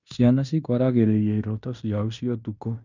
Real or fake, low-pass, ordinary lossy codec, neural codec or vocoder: fake; 7.2 kHz; none; codec, 16 kHz in and 24 kHz out, 0.9 kbps, LongCat-Audio-Codec, fine tuned four codebook decoder